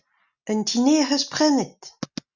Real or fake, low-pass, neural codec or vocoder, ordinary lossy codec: real; 7.2 kHz; none; Opus, 64 kbps